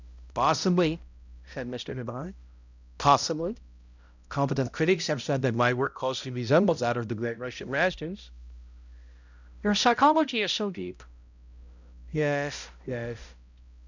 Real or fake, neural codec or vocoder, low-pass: fake; codec, 16 kHz, 0.5 kbps, X-Codec, HuBERT features, trained on balanced general audio; 7.2 kHz